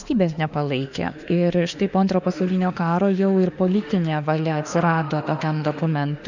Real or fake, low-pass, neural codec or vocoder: fake; 7.2 kHz; autoencoder, 48 kHz, 32 numbers a frame, DAC-VAE, trained on Japanese speech